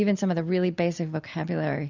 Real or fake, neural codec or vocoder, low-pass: real; none; 7.2 kHz